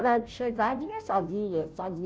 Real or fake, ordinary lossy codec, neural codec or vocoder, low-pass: fake; none; codec, 16 kHz, 0.5 kbps, FunCodec, trained on Chinese and English, 25 frames a second; none